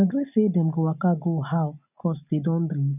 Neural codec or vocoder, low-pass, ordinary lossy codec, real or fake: none; 3.6 kHz; none; real